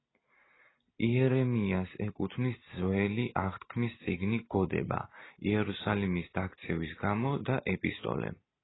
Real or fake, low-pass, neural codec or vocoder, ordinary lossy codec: real; 7.2 kHz; none; AAC, 16 kbps